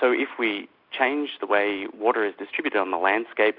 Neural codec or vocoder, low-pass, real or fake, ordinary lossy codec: none; 5.4 kHz; real; MP3, 48 kbps